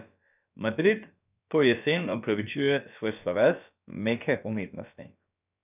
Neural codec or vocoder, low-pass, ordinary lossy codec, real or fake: codec, 16 kHz, about 1 kbps, DyCAST, with the encoder's durations; 3.6 kHz; none; fake